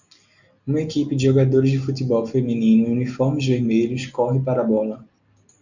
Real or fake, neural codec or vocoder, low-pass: real; none; 7.2 kHz